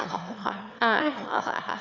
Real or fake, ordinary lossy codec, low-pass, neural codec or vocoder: fake; none; 7.2 kHz; autoencoder, 22.05 kHz, a latent of 192 numbers a frame, VITS, trained on one speaker